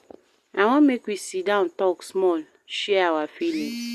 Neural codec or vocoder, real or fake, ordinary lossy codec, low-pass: none; real; Opus, 64 kbps; 14.4 kHz